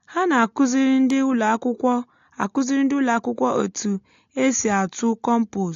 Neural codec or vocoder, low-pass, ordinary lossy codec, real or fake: none; 7.2 kHz; AAC, 48 kbps; real